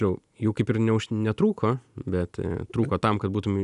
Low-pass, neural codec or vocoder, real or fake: 10.8 kHz; none; real